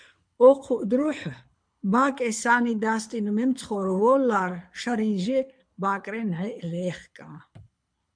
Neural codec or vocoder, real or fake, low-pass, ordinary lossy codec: codec, 24 kHz, 6 kbps, HILCodec; fake; 9.9 kHz; MP3, 64 kbps